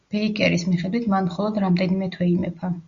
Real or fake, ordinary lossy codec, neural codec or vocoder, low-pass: real; Opus, 64 kbps; none; 7.2 kHz